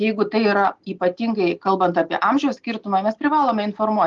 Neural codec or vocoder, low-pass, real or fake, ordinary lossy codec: none; 7.2 kHz; real; Opus, 32 kbps